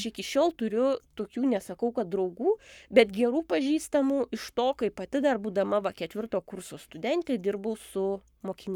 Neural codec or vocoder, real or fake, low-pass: codec, 44.1 kHz, 7.8 kbps, Pupu-Codec; fake; 19.8 kHz